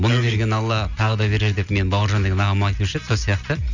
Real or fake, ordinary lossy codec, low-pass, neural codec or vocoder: real; none; 7.2 kHz; none